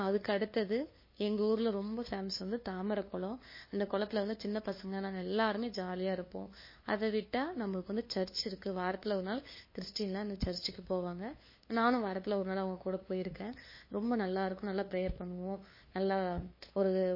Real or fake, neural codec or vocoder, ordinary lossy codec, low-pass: fake; codec, 16 kHz, 2 kbps, FunCodec, trained on LibriTTS, 25 frames a second; MP3, 24 kbps; 5.4 kHz